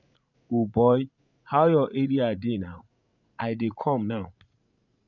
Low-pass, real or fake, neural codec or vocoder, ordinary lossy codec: 7.2 kHz; fake; vocoder, 44.1 kHz, 80 mel bands, Vocos; none